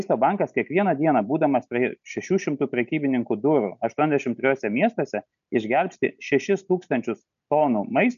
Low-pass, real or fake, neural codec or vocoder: 7.2 kHz; real; none